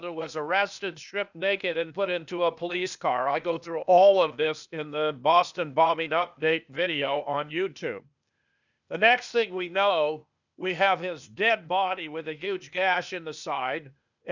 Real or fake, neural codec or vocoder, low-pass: fake; codec, 16 kHz, 0.8 kbps, ZipCodec; 7.2 kHz